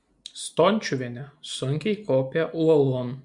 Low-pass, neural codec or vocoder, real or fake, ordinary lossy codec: 10.8 kHz; none; real; MP3, 64 kbps